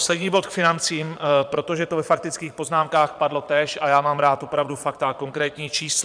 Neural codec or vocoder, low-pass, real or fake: vocoder, 22.05 kHz, 80 mel bands, Vocos; 9.9 kHz; fake